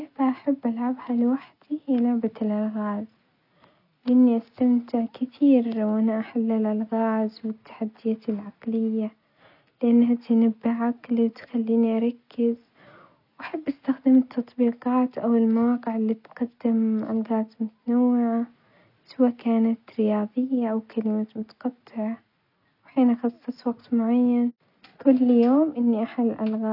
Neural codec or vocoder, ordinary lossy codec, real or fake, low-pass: none; none; real; 5.4 kHz